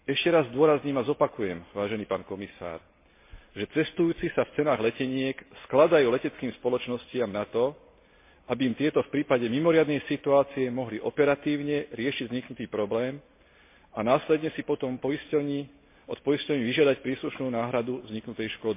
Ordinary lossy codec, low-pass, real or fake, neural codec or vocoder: MP3, 24 kbps; 3.6 kHz; real; none